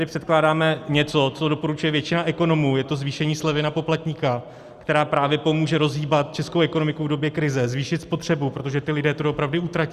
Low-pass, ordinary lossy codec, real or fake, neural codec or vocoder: 14.4 kHz; Opus, 64 kbps; real; none